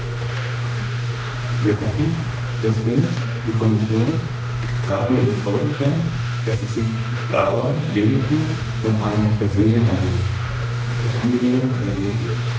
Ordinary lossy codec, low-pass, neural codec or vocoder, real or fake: none; none; codec, 16 kHz, 1 kbps, X-Codec, HuBERT features, trained on general audio; fake